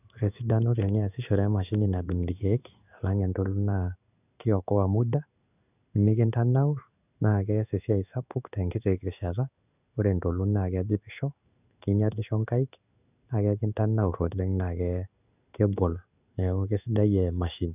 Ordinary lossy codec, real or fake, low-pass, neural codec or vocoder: none; fake; 3.6 kHz; codec, 16 kHz in and 24 kHz out, 1 kbps, XY-Tokenizer